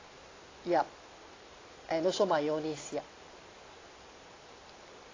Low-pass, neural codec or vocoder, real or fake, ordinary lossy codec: 7.2 kHz; none; real; AAC, 32 kbps